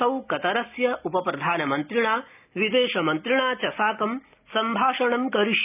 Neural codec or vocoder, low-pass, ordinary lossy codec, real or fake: none; 3.6 kHz; none; real